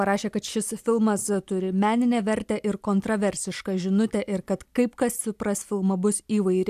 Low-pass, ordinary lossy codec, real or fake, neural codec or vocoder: 14.4 kHz; AAC, 96 kbps; real; none